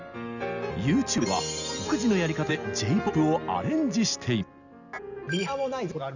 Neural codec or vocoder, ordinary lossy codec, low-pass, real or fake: none; none; 7.2 kHz; real